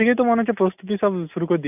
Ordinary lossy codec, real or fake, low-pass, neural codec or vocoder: none; real; 3.6 kHz; none